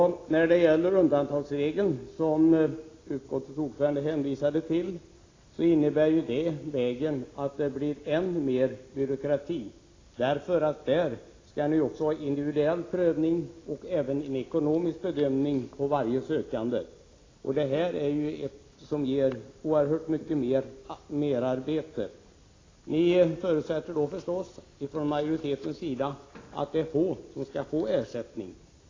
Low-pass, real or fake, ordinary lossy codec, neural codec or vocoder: 7.2 kHz; real; AAC, 32 kbps; none